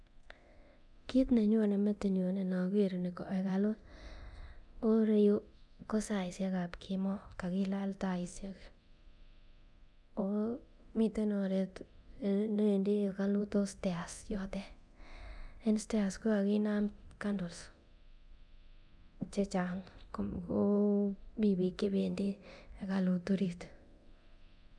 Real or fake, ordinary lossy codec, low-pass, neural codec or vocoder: fake; none; none; codec, 24 kHz, 0.9 kbps, DualCodec